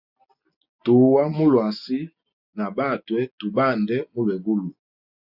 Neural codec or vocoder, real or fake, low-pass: none; real; 5.4 kHz